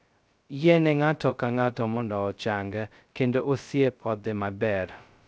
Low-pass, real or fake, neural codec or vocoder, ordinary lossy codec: none; fake; codec, 16 kHz, 0.2 kbps, FocalCodec; none